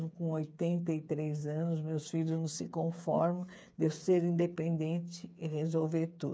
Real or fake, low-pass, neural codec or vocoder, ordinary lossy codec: fake; none; codec, 16 kHz, 8 kbps, FreqCodec, smaller model; none